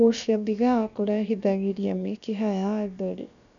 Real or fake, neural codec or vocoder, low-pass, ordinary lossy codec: fake; codec, 16 kHz, about 1 kbps, DyCAST, with the encoder's durations; 7.2 kHz; none